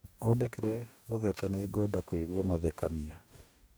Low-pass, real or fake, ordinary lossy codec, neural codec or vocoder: none; fake; none; codec, 44.1 kHz, 2.6 kbps, DAC